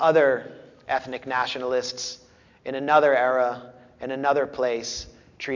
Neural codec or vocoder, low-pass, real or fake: none; 7.2 kHz; real